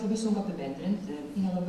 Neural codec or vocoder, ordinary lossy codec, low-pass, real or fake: none; Opus, 64 kbps; 14.4 kHz; real